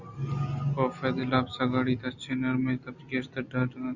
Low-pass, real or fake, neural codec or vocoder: 7.2 kHz; real; none